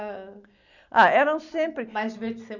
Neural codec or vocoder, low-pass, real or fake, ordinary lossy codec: none; 7.2 kHz; real; none